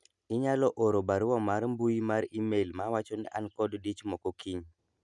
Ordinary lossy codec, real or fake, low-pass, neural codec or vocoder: none; real; 10.8 kHz; none